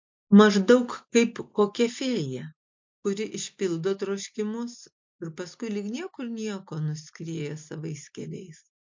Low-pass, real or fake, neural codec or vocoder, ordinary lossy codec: 7.2 kHz; real; none; MP3, 48 kbps